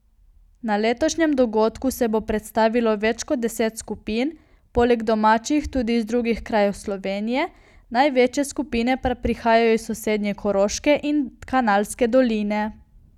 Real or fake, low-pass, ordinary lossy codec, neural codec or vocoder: real; 19.8 kHz; none; none